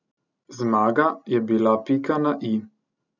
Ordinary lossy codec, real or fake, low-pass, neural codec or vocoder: none; real; 7.2 kHz; none